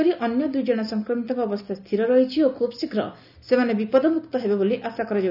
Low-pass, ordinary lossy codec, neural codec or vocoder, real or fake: 5.4 kHz; none; none; real